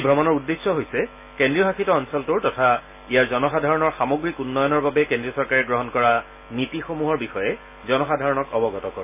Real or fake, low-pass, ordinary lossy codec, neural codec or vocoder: real; 3.6 kHz; AAC, 32 kbps; none